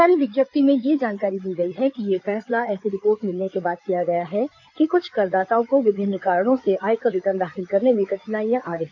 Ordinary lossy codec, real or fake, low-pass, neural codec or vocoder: none; fake; 7.2 kHz; codec, 16 kHz in and 24 kHz out, 2.2 kbps, FireRedTTS-2 codec